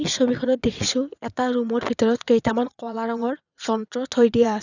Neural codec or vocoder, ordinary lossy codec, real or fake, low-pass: vocoder, 22.05 kHz, 80 mel bands, WaveNeXt; none; fake; 7.2 kHz